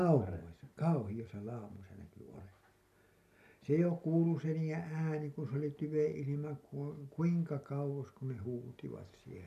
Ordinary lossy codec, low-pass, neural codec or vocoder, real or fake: MP3, 96 kbps; 14.4 kHz; none; real